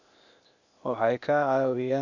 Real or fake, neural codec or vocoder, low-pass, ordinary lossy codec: fake; codec, 16 kHz, 0.8 kbps, ZipCodec; 7.2 kHz; none